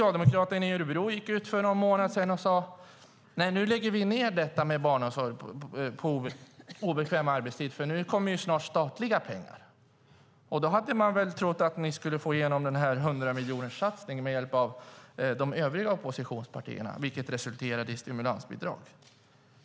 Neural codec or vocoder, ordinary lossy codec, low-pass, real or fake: none; none; none; real